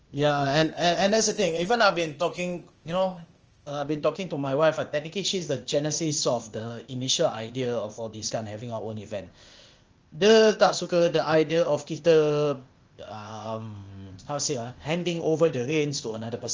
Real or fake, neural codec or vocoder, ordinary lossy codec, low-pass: fake; codec, 16 kHz, 0.8 kbps, ZipCodec; Opus, 24 kbps; 7.2 kHz